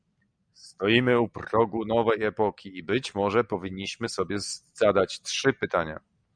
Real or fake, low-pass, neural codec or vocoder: real; 9.9 kHz; none